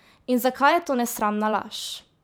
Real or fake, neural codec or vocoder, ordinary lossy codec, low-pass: fake; vocoder, 44.1 kHz, 128 mel bands every 512 samples, BigVGAN v2; none; none